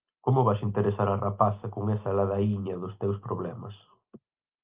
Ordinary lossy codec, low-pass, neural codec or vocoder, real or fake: Opus, 32 kbps; 3.6 kHz; none; real